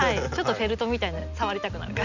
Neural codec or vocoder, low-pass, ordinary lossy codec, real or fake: none; 7.2 kHz; none; real